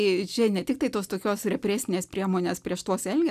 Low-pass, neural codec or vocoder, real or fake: 14.4 kHz; none; real